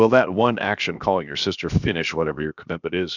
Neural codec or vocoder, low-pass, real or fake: codec, 16 kHz, 0.7 kbps, FocalCodec; 7.2 kHz; fake